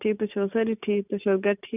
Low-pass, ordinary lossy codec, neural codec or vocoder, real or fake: 3.6 kHz; none; none; real